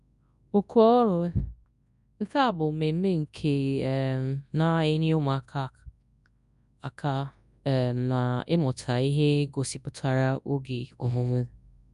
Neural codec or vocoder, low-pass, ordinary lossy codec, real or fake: codec, 24 kHz, 0.9 kbps, WavTokenizer, large speech release; 10.8 kHz; none; fake